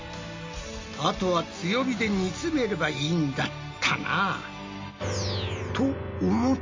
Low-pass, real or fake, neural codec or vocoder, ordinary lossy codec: 7.2 kHz; real; none; MP3, 32 kbps